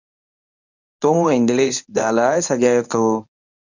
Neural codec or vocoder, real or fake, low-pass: codec, 24 kHz, 0.9 kbps, WavTokenizer, medium speech release version 1; fake; 7.2 kHz